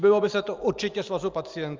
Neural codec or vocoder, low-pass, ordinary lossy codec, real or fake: none; 7.2 kHz; Opus, 24 kbps; real